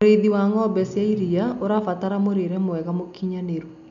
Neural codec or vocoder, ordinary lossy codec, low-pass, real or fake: none; none; 7.2 kHz; real